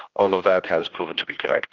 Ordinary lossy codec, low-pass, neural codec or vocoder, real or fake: Opus, 32 kbps; 7.2 kHz; codec, 16 kHz, 1 kbps, X-Codec, HuBERT features, trained on general audio; fake